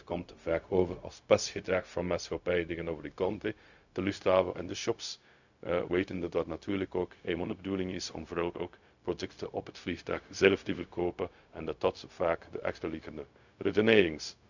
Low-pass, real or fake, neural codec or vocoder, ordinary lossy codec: 7.2 kHz; fake; codec, 16 kHz, 0.4 kbps, LongCat-Audio-Codec; none